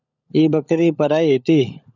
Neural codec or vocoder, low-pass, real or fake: codec, 16 kHz, 16 kbps, FunCodec, trained on LibriTTS, 50 frames a second; 7.2 kHz; fake